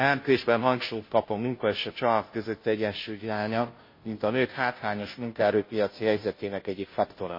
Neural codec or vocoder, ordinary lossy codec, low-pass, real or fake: codec, 16 kHz, 0.5 kbps, FunCodec, trained on Chinese and English, 25 frames a second; MP3, 24 kbps; 5.4 kHz; fake